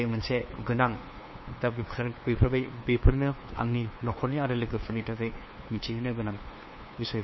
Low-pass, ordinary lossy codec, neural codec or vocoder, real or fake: 7.2 kHz; MP3, 24 kbps; codec, 16 kHz, 2 kbps, FunCodec, trained on LibriTTS, 25 frames a second; fake